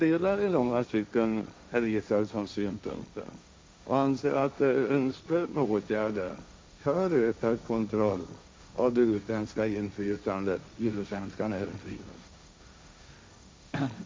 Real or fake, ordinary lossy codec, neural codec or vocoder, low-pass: fake; none; codec, 16 kHz, 1.1 kbps, Voila-Tokenizer; none